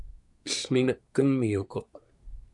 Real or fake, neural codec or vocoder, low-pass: fake; codec, 24 kHz, 1 kbps, SNAC; 10.8 kHz